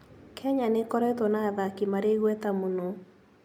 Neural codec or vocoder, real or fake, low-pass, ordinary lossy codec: none; real; 19.8 kHz; none